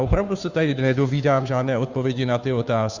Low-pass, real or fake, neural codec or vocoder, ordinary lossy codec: 7.2 kHz; fake; codec, 16 kHz, 2 kbps, FunCodec, trained on Chinese and English, 25 frames a second; Opus, 64 kbps